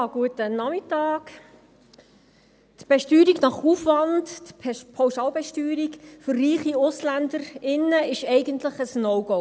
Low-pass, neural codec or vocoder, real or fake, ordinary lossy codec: none; none; real; none